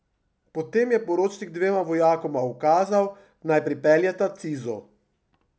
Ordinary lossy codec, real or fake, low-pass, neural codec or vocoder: none; real; none; none